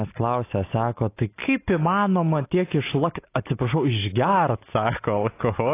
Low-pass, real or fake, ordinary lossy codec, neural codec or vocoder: 3.6 kHz; real; AAC, 24 kbps; none